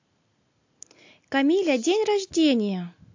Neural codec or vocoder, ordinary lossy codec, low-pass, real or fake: none; AAC, 48 kbps; 7.2 kHz; real